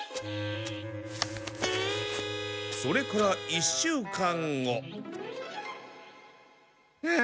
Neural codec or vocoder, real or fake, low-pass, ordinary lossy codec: none; real; none; none